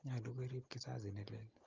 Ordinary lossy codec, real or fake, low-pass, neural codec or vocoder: Opus, 32 kbps; real; 7.2 kHz; none